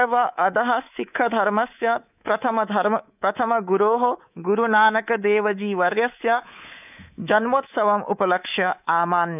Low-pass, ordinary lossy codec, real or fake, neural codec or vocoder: 3.6 kHz; none; fake; codec, 24 kHz, 3.1 kbps, DualCodec